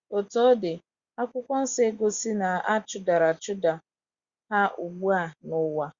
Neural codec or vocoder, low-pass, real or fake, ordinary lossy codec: none; 7.2 kHz; real; Opus, 64 kbps